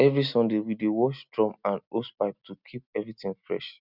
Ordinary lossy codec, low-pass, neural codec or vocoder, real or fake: none; 5.4 kHz; none; real